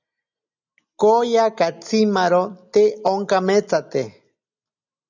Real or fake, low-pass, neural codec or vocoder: real; 7.2 kHz; none